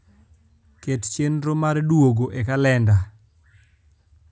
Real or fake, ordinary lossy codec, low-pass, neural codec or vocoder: real; none; none; none